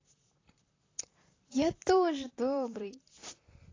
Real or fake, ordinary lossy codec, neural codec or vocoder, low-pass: fake; AAC, 32 kbps; vocoder, 44.1 kHz, 128 mel bands, Pupu-Vocoder; 7.2 kHz